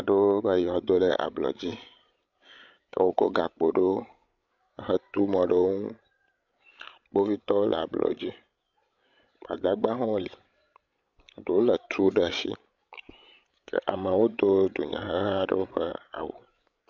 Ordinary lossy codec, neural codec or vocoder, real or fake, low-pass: MP3, 64 kbps; codec, 16 kHz, 16 kbps, FreqCodec, larger model; fake; 7.2 kHz